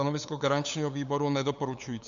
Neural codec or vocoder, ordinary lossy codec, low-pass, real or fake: codec, 16 kHz, 8 kbps, FunCodec, trained on LibriTTS, 25 frames a second; AAC, 64 kbps; 7.2 kHz; fake